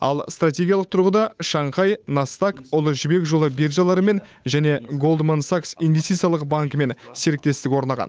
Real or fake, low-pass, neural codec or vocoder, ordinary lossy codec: fake; none; codec, 16 kHz, 8 kbps, FunCodec, trained on Chinese and English, 25 frames a second; none